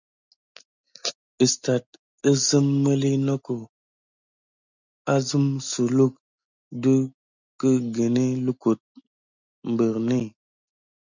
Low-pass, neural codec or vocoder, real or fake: 7.2 kHz; none; real